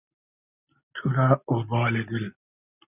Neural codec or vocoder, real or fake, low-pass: none; real; 3.6 kHz